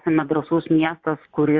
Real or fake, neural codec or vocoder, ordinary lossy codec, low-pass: fake; vocoder, 24 kHz, 100 mel bands, Vocos; Opus, 64 kbps; 7.2 kHz